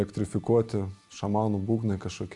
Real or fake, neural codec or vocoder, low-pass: real; none; 10.8 kHz